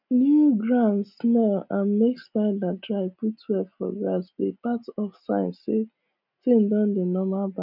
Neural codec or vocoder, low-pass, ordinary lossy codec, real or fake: none; 5.4 kHz; none; real